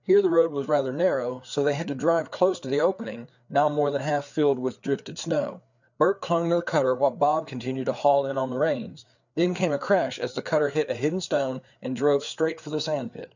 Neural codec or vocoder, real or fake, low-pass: codec, 16 kHz, 4 kbps, FreqCodec, larger model; fake; 7.2 kHz